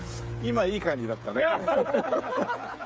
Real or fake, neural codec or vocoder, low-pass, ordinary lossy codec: fake; codec, 16 kHz, 16 kbps, FreqCodec, smaller model; none; none